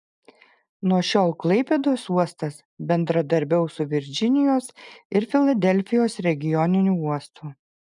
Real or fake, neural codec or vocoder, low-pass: real; none; 10.8 kHz